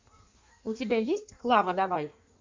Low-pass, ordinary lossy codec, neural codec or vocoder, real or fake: 7.2 kHz; MP3, 48 kbps; codec, 16 kHz in and 24 kHz out, 1.1 kbps, FireRedTTS-2 codec; fake